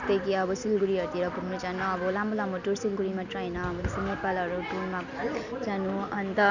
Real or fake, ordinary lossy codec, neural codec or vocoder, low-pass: real; none; none; 7.2 kHz